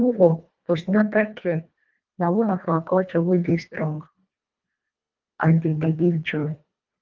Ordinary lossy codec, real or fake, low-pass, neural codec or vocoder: Opus, 32 kbps; fake; 7.2 kHz; codec, 24 kHz, 1.5 kbps, HILCodec